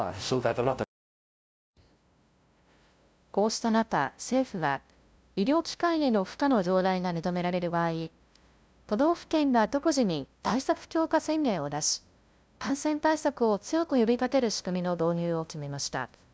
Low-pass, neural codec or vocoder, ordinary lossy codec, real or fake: none; codec, 16 kHz, 0.5 kbps, FunCodec, trained on LibriTTS, 25 frames a second; none; fake